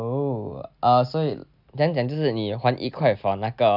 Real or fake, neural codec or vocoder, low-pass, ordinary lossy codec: real; none; 5.4 kHz; none